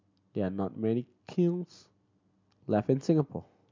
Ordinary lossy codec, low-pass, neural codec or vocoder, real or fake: MP3, 64 kbps; 7.2 kHz; none; real